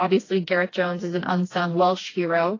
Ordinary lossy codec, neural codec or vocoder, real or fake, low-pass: AAC, 32 kbps; codec, 16 kHz, 2 kbps, FreqCodec, smaller model; fake; 7.2 kHz